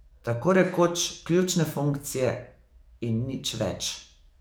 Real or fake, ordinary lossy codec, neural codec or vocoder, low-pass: fake; none; codec, 44.1 kHz, 7.8 kbps, DAC; none